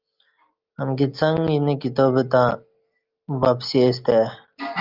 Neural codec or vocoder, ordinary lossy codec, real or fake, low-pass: none; Opus, 32 kbps; real; 5.4 kHz